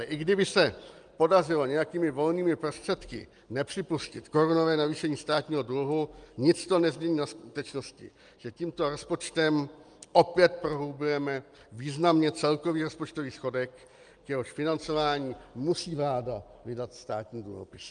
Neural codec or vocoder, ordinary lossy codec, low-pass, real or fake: none; Opus, 32 kbps; 9.9 kHz; real